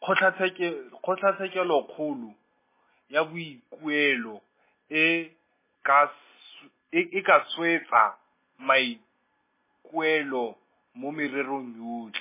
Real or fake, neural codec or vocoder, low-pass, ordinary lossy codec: real; none; 3.6 kHz; MP3, 16 kbps